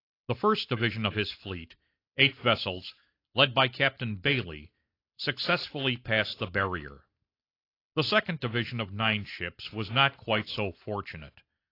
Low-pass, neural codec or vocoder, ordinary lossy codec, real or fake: 5.4 kHz; none; AAC, 32 kbps; real